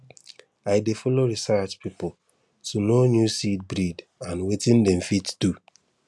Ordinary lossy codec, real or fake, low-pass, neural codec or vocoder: none; real; none; none